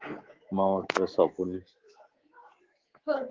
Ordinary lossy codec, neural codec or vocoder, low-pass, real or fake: Opus, 16 kbps; codec, 24 kHz, 3.1 kbps, DualCodec; 7.2 kHz; fake